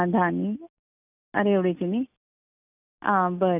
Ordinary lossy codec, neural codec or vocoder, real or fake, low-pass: none; none; real; 3.6 kHz